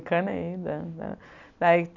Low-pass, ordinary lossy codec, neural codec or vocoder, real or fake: 7.2 kHz; none; none; real